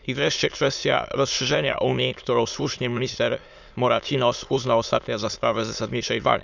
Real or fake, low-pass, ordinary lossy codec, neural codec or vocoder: fake; 7.2 kHz; none; autoencoder, 22.05 kHz, a latent of 192 numbers a frame, VITS, trained on many speakers